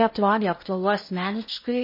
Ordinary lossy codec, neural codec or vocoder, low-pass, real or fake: MP3, 24 kbps; codec, 16 kHz in and 24 kHz out, 0.6 kbps, FocalCodec, streaming, 2048 codes; 5.4 kHz; fake